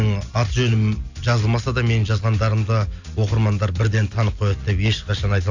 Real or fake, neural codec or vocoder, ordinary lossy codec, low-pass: real; none; none; 7.2 kHz